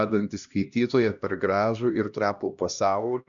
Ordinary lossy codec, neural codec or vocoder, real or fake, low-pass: MP3, 96 kbps; codec, 16 kHz, 1 kbps, X-Codec, HuBERT features, trained on LibriSpeech; fake; 7.2 kHz